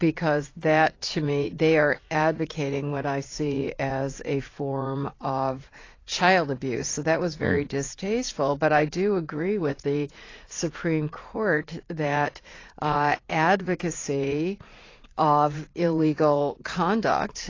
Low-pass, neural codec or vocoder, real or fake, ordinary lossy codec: 7.2 kHz; vocoder, 44.1 kHz, 80 mel bands, Vocos; fake; AAC, 32 kbps